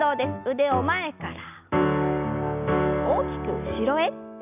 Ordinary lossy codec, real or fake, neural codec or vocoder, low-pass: none; real; none; 3.6 kHz